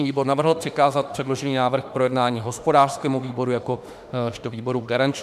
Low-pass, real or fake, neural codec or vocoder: 14.4 kHz; fake; autoencoder, 48 kHz, 32 numbers a frame, DAC-VAE, trained on Japanese speech